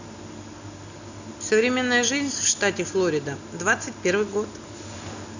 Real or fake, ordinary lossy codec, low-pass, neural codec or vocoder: real; none; 7.2 kHz; none